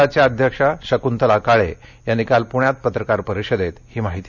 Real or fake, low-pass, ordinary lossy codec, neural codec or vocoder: real; 7.2 kHz; none; none